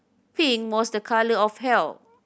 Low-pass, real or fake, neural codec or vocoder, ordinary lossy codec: none; real; none; none